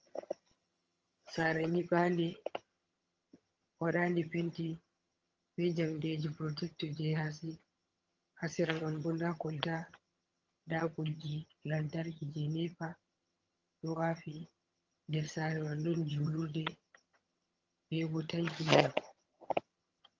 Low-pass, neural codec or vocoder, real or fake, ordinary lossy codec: 7.2 kHz; vocoder, 22.05 kHz, 80 mel bands, HiFi-GAN; fake; Opus, 24 kbps